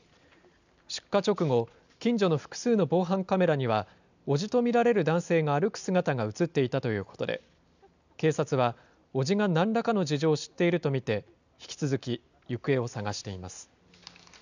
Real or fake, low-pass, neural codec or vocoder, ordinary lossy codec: real; 7.2 kHz; none; none